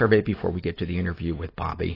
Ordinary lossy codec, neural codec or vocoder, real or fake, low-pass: AAC, 24 kbps; none; real; 5.4 kHz